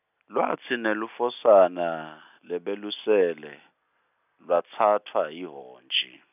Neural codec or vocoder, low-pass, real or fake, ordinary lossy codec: none; 3.6 kHz; real; none